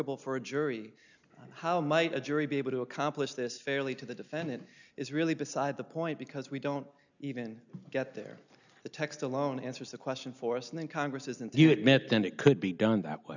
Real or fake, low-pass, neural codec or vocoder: real; 7.2 kHz; none